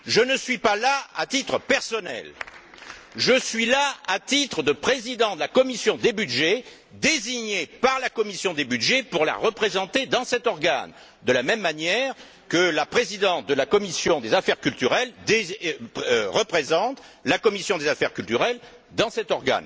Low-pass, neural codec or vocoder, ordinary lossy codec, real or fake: none; none; none; real